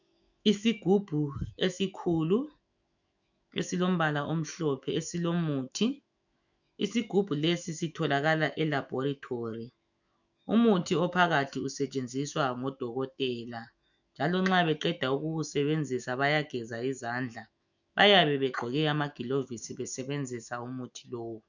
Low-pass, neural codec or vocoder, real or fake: 7.2 kHz; autoencoder, 48 kHz, 128 numbers a frame, DAC-VAE, trained on Japanese speech; fake